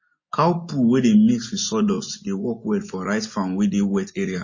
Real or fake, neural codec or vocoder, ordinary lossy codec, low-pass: real; none; MP3, 32 kbps; 7.2 kHz